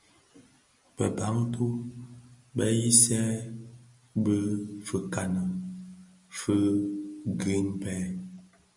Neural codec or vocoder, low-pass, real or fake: none; 10.8 kHz; real